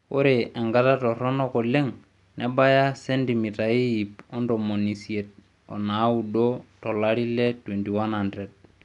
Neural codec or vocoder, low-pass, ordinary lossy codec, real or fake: none; 10.8 kHz; none; real